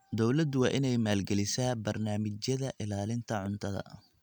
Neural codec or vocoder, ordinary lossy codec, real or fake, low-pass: none; none; real; 19.8 kHz